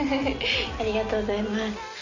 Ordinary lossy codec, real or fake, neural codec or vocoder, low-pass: none; real; none; 7.2 kHz